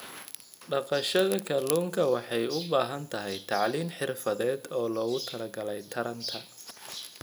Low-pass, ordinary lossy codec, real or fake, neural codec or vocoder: none; none; real; none